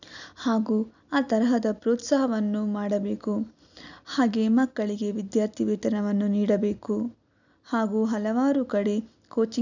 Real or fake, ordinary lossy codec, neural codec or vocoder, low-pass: real; none; none; 7.2 kHz